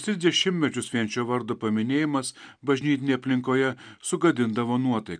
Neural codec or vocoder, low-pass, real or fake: none; 9.9 kHz; real